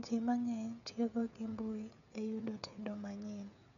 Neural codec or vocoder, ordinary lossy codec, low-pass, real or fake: codec, 16 kHz, 16 kbps, FreqCodec, smaller model; none; 7.2 kHz; fake